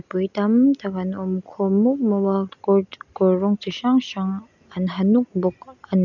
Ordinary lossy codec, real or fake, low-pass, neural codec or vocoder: none; real; 7.2 kHz; none